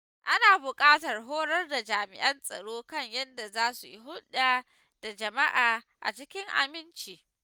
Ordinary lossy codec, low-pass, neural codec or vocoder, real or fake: none; none; none; real